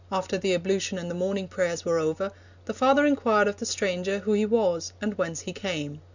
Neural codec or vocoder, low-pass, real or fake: none; 7.2 kHz; real